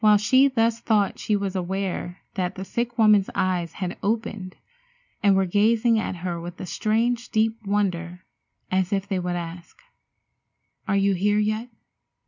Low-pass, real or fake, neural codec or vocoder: 7.2 kHz; real; none